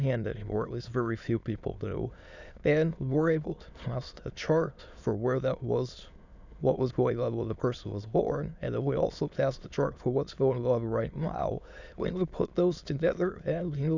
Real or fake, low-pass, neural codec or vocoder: fake; 7.2 kHz; autoencoder, 22.05 kHz, a latent of 192 numbers a frame, VITS, trained on many speakers